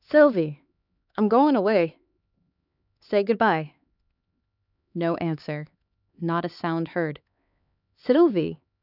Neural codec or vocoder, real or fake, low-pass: codec, 16 kHz, 4 kbps, X-Codec, HuBERT features, trained on LibriSpeech; fake; 5.4 kHz